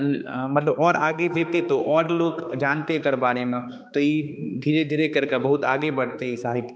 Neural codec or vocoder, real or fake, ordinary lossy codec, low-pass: codec, 16 kHz, 2 kbps, X-Codec, HuBERT features, trained on balanced general audio; fake; none; none